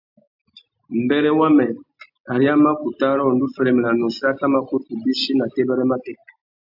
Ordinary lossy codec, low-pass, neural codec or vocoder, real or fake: AAC, 48 kbps; 5.4 kHz; none; real